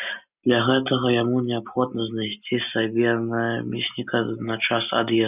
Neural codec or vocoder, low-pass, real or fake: none; 3.6 kHz; real